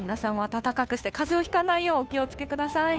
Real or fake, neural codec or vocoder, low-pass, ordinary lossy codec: fake; codec, 16 kHz, 2 kbps, FunCodec, trained on Chinese and English, 25 frames a second; none; none